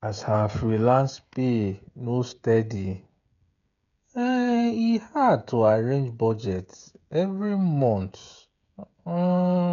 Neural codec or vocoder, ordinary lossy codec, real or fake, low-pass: codec, 16 kHz, 16 kbps, FreqCodec, smaller model; none; fake; 7.2 kHz